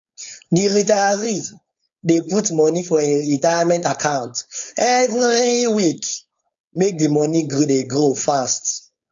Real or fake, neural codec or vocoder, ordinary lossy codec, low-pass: fake; codec, 16 kHz, 4.8 kbps, FACodec; AAC, 64 kbps; 7.2 kHz